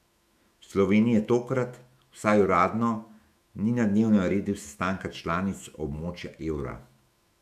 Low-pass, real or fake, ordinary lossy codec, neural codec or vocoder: 14.4 kHz; fake; none; autoencoder, 48 kHz, 128 numbers a frame, DAC-VAE, trained on Japanese speech